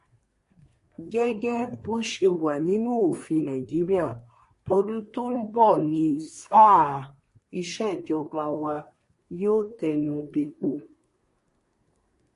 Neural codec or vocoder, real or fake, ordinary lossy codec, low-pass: codec, 24 kHz, 1 kbps, SNAC; fake; MP3, 48 kbps; 10.8 kHz